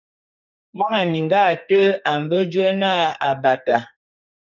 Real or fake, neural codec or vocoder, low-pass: fake; codec, 44.1 kHz, 2.6 kbps, SNAC; 7.2 kHz